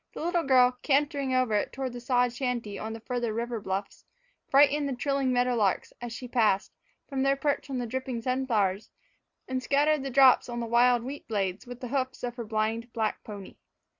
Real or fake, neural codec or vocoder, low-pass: real; none; 7.2 kHz